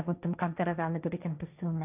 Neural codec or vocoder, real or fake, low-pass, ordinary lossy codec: codec, 16 kHz, 1.1 kbps, Voila-Tokenizer; fake; 3.6 kHz; none